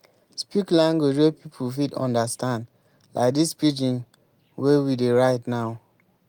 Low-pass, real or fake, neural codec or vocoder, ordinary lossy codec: 19.8 kHz; real; none; Opus, 32 kbps